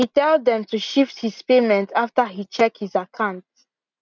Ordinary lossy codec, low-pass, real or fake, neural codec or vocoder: none; 7.2 kHz; real; none